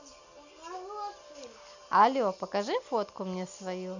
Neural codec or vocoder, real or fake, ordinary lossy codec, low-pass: none; real; none; 7.2 kHz